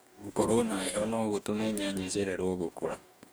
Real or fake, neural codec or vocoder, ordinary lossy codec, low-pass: fake; codec, 44.1 kHz, 2.6 kbps, DAC; none; none